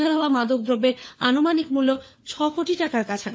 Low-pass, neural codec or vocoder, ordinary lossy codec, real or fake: none; codec, 16 kHz, 4 kbps, FunCodec, trained on LibriTTS, 50 frames a second; none; fake